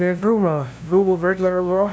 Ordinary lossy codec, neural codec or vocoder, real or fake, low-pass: none; codec, 16 kHz, 0.5 kbps, FunCodec, trained on LibriTTS, 25 frames a second; fake; none